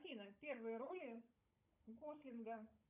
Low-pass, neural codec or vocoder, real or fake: 3.6 kHz; codec, 16 kHz, 16 kbps, FunCodec, trained on Chinese and English, 50 frames a second; fake